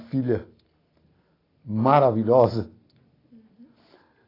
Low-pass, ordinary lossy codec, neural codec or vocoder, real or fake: 5.4 kHz; AAC, 24 kbps; none; real